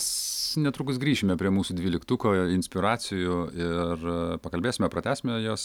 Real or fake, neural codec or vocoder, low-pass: real; none; 19.8 kHz